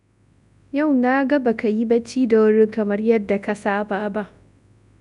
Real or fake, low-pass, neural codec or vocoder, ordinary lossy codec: fake; 10.8 kHz; codec, 24 kHz, 0.9 kbps, WavTokenizer, large speech release; none